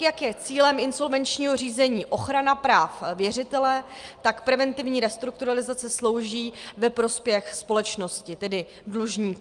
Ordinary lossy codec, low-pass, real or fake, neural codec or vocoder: Opus, 32 kbps; 10.8 kHz; real; none